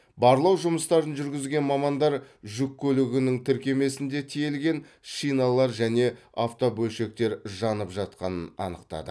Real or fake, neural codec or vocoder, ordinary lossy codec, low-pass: real; none; none; none